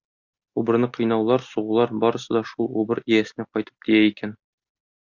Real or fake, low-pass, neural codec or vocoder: real; 7.2 kHz; none